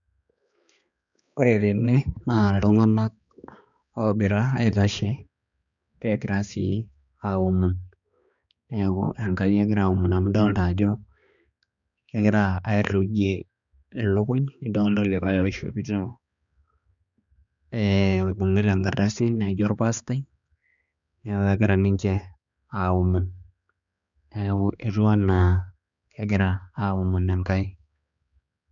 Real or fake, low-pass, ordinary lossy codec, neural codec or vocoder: fake; 7.2 kHz; none; codec, 16 kHz, 2 kbps, X-Codec, HuBERT features, trained on balanced general audio